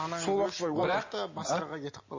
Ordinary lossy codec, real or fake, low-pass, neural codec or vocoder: MP3, 32 kbps; real; 7.2 kHz; none